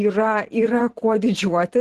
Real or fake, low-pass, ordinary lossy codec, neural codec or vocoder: fake; 14.4 kHz; Opus, 16 kbps; vocoder, 44.1 kHz, 128 mel bands every 512 samples, BigVGAN v2